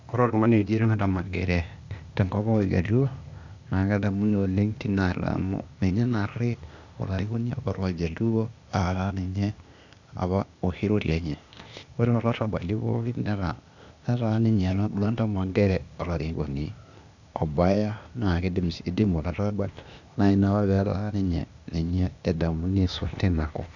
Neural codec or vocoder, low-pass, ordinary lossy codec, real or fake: codec, 16 kHz, 0.8 kbps, ZipCodec; 7.2 kHz; none; fake